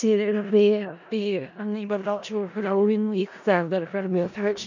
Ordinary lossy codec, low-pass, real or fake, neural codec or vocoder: none; 7.2 kHz; fake; codec, 16 kHz in and 24 kHz out, 0.4 kbps, LongCat-Audio-Codec, four codebook decoder